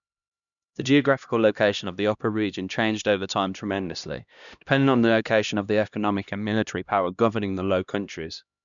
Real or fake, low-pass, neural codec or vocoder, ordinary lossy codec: fake; 7.2 kHz; codec, 16 kHz, 1 kbps, X-Codec, HuBERT features, trained on LibriSpeech; none